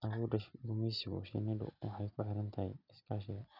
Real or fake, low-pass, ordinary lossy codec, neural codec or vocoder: real; 5.4 kHz; none; none